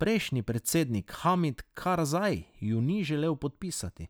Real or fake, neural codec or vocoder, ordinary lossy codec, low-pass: real; none; none; none